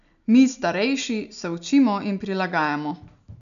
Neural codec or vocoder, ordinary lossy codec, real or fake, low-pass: none; none; real; 7.2 kHz